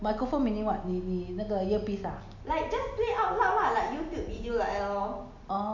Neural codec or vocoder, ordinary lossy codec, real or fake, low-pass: none; none; real; 7.2 kHz